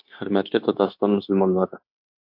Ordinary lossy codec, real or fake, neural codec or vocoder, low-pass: MP3, 48 kbps; fake; codec, 16 kHz, 0.9 kbps, LongCat-Audio-Codec; 5.4 kHz